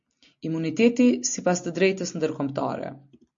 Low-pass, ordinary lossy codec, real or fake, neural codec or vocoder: 7.2 kHz; MP3, 48 kbps; real; none